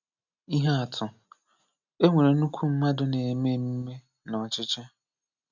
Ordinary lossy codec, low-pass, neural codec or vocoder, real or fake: none; 7.2 kHz; none; real